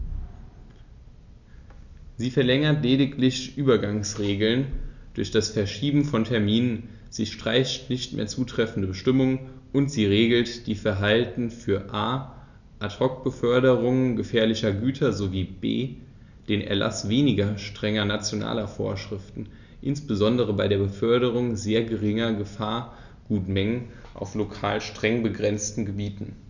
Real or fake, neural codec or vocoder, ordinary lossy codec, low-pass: real; none; none; 7.2 kHz